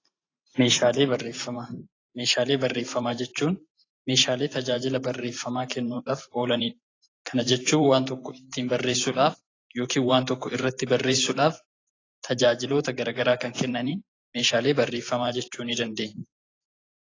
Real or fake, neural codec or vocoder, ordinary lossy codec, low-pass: fake; vocoder, 44.1 kHz, 128 mel bands every 512 samples, BigVGAN v2; AAC, 32 kbps; 7.2 kHz